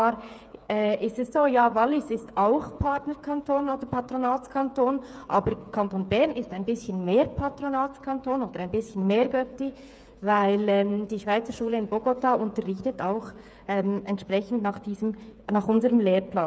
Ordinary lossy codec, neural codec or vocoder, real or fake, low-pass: none; codec, 16 kHz, 8 kbps, FreqCodec, smaller model; fake; none